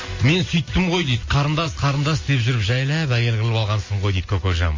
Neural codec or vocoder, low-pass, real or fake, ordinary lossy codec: none; 7.2 kHz; real; MP3, 32 kbps